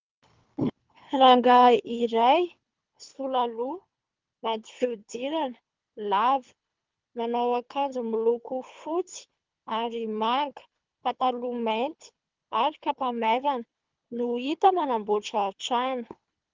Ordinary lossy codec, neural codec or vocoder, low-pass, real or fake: Opus, 24 kbps; codec, 24 kHz, 3 kbps, HILCodec; 7.2 kHz; fake